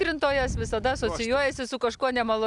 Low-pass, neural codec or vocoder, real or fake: 10.8 kHz; none; real